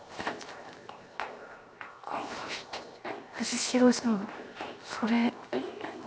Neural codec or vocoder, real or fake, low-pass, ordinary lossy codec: codec, 16 kHz, 0.7 kbps, FocalCodec; fake; none; none